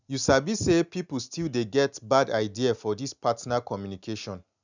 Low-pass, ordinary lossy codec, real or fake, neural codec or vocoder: 7.2 kHz; none; real; none